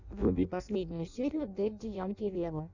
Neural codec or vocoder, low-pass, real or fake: codec, 16 kHz in and 24 kHz out, 0.6 kbps, FireRedTTS-2 codec; 7.2 kHz; fake